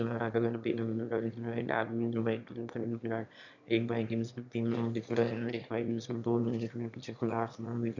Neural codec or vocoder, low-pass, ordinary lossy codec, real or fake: autoencoder, 22.05 kHz, a latent of 192 numbers a frame, VITS, trained on one speaker; 7.2 kHz; none; fake